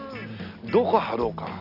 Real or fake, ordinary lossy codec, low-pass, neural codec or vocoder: real; none; 5.4 kHz; none